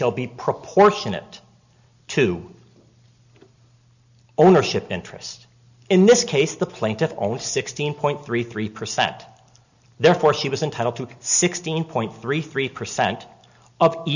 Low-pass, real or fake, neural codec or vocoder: 7.2 kHz; real; none